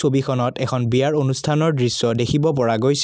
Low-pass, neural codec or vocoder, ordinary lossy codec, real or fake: none; none; none; real